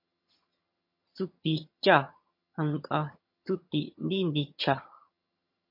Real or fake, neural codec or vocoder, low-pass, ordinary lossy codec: fake; vocoder, 22.05 kHz, 80 mel bands, HiFi-GAN; 5.4 kHz; MP3, 32 kbps